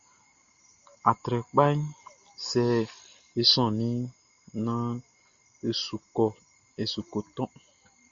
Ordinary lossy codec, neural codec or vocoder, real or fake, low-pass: Opus, 64 kbps; none; real; 7.2 kHz